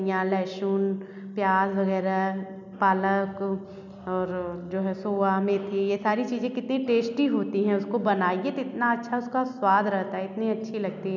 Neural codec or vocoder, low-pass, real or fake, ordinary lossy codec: none; 7.2 kHz; real; none